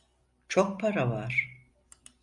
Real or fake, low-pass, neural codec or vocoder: fake; 10.8 kHz; vocoder, 44.1 kHz, 128 mel bands every 256 samples, BigVGAN v2